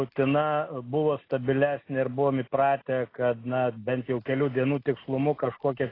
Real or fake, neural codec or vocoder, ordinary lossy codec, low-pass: real; none; AAC, 24 kbps; 5.4 kHz